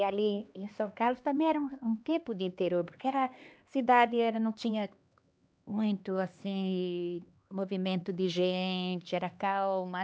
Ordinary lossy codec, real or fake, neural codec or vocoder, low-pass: none; fake; codec, 16 kHz, 2 kbps, X-Codec, HuBERT features, trained on LibriSpeech; none